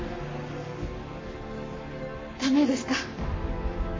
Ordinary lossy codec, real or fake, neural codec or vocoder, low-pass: MP3, 32 kbps; fake; codec, 44.1 kHz, 7.8 kbps, Pupu-Codec; 7.2 kHz